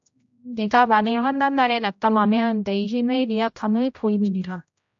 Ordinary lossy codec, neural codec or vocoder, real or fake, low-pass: AAC, 64 kbps; codec, 16 kHz, 0.5 kbps, X-Codec, HuBERT features, trained on general audio; fake; 7.2 kHz